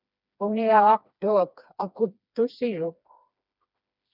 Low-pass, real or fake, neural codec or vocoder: 5.4 kHz; fake; codec, 16 kHz, 2 kbps, FreqCodec, smaller model